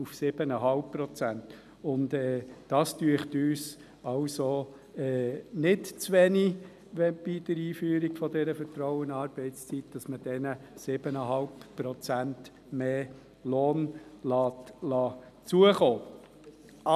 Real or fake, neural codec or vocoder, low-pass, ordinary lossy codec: real; none; 14.4 kHz; none